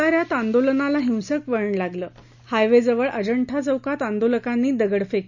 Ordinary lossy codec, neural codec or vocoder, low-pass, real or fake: none; none; 7.2 kHz; real